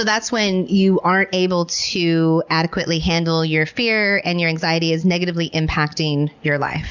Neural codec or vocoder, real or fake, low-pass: none; real; 7.2 kHz